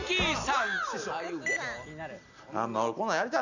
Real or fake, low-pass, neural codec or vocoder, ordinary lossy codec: real; 7.2 kHz; none; none